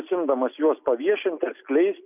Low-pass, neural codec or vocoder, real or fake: 3.6 kHz; none; real